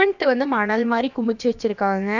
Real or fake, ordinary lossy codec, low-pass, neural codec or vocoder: fake; none; 7.2 kHz; codec, 16 kHz, about 1 kbps, DyCAST, with the encoder's durations